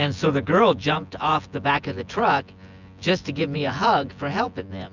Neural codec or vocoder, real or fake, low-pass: vocoder, 24 kHz, 100 mel bands, Vocos; fake; 7.2 kHz